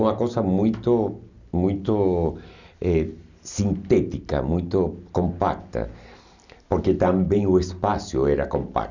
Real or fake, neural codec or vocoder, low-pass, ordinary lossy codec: real; none; 7.2 kHz; none